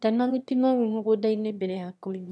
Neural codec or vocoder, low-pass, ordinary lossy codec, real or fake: autoencoder, 22.05 kHz, a latent of 192 numbers a frame, VITS, trained on one speaker; none; none; fake